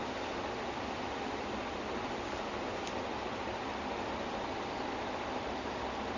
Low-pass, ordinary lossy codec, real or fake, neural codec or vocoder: 7.2 kHz; none; real; none